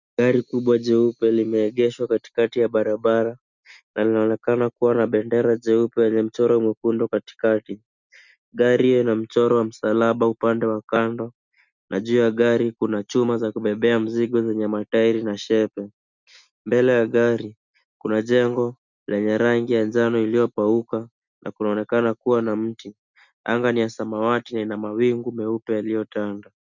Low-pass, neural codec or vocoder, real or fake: 7.2 kHz; none; real